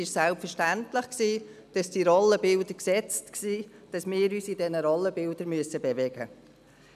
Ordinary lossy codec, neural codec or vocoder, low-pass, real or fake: none; none; 14.4 kHz; real